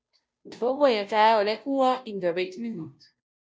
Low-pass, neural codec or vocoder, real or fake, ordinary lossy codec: none; codec, 16 kHz, 0.5 kbps, FunCodec, trained on Chinese and English, 25 frames a second; fake; none